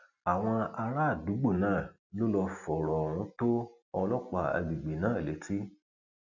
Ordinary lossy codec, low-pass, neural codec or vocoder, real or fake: none; 7.2 kHz; none; real